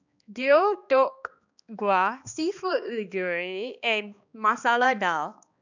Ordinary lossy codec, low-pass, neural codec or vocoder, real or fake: none; 7.2 kHz; codec, 16 kHz, 2 kbps, X-Codec, HuBERT features, trained on balanced general audio; fake